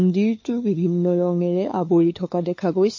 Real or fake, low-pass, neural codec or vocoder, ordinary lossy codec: fake; 7.2 kHz; codec, 16 kHz, 2 kbps, FunCodec, trained on LibriTTS, 25 frames a second; MP3, 32 kbps